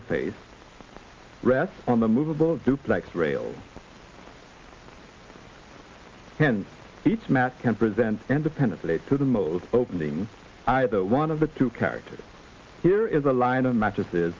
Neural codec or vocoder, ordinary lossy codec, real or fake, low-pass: none; Opus, 24 kbps; real; 7.2 kHz